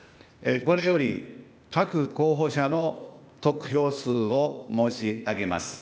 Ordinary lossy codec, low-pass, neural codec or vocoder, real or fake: none; none; codec, 16 kHz, 0.8 kbps, ZipCodec; fake